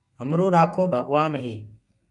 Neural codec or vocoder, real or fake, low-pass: codec, 32 kHz, 1.9 kbps, SNAC; fake; 10.8 kHz